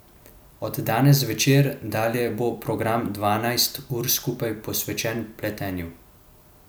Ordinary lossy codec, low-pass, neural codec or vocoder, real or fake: none; none; none; real